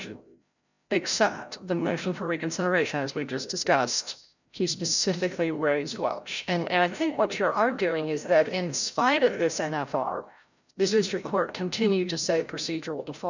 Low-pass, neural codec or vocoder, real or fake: 7.2 kHz; codec, 16 kHz, 0.5 kbps, FreqCodec, larger model; fake